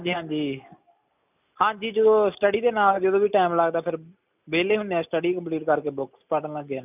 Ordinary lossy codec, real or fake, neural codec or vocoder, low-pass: none; real; none; 3.6 kHz